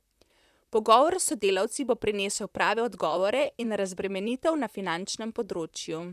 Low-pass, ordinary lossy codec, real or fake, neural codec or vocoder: 14.4 kHz; none; fake; vocoder, 44.1 kHz, 128 mel bands, Pupu-Vocoder